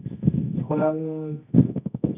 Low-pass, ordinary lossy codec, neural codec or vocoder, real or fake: 3.6 kHz; none; codec, 24 kHz, 0.9 kbps, WavTokenizer, medium music audio release; fake